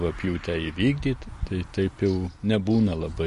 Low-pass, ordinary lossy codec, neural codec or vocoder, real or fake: 14.4 kHz; MP3, 48 kbps; none; real